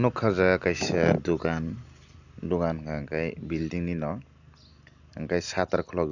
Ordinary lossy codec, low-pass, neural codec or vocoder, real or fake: none; 7.2 kHz; none; real